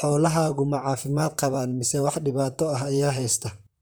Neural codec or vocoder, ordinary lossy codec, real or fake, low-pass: vocoder, 44.1 kHz, 128 mel bands, Pupu-Vocoder; none; fake; none